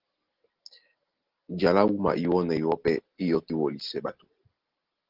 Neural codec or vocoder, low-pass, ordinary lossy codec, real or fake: none; 5.4 kHz; Opus, 16 kbps; real